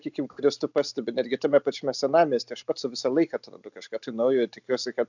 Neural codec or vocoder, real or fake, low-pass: none; real; 7.2 kHz